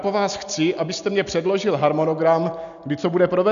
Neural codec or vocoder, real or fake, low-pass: none; real; 7.2 kHz